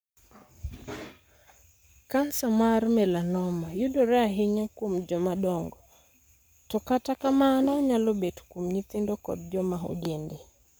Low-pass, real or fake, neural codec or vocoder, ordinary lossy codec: none; fake; codec, 44.1 kHz, 7.8 kbps, Pupu-Codec; none